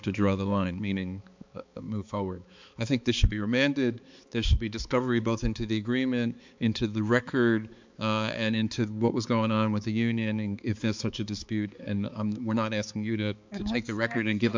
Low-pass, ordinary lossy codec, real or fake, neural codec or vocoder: 7.2 kHz; MP3, 64 kbps; fake; codec, 16 kHz, 4 kbps, X-Codec, HuBERT features, trained on balanced general audio